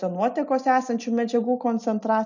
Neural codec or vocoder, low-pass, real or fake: none; 7.2 kHz; real